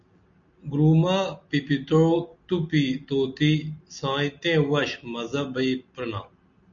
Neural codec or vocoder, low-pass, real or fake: none; 7.2 kHz; real